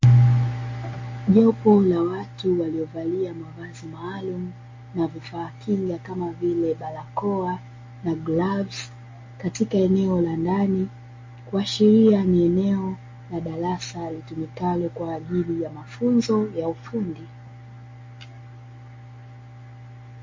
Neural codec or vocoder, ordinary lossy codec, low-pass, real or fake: none; MP3, 32 kbps; 7.2 kHz; real